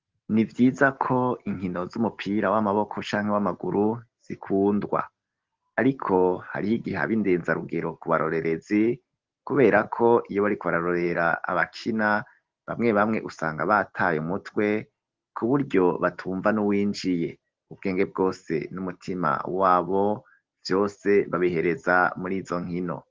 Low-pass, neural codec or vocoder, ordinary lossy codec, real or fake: 7.2 kHz; none; Opus, 16 kbps; real